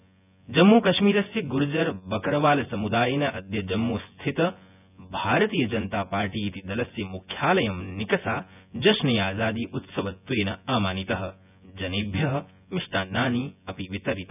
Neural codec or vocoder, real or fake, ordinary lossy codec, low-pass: vocoder, 24 kHz, 100 mel bands, Vocos; fake; none; 3.6 kHz